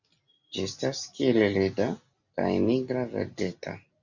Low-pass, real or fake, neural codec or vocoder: 7.2 kHz; real; none